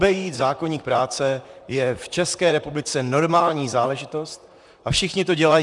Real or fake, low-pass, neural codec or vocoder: fake; 10.8 kHz; vocoder, 44.1 kHz, 128 mel bands, Pupu-Vocoder